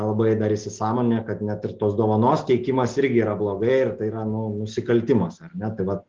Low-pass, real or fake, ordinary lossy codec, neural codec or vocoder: 7.2 kHz; real; Opus, 16 kbps; none